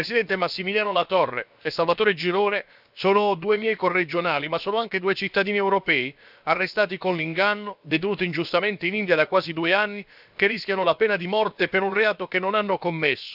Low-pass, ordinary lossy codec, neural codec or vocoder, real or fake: 5.4 kHz; none; codec, 16 kHz, about 1 kbps, DyCAST, with the encoder's durations; fake